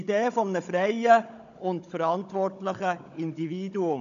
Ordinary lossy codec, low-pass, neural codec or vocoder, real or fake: none; 7.2 kHz; codec, 16 kHz, 16 kbps, FunCodec, trained on Chinese and English, 50 frames a second; fake